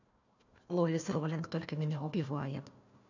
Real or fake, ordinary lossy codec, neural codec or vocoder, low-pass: fake; none; codec, 16 kHz, 1 kbps, FunCodec, trained on Chinese and English, 50 frames a second; 7.2 kHz